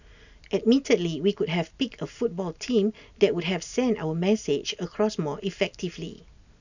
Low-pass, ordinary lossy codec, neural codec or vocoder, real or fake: 7.2 kHz; none; none; real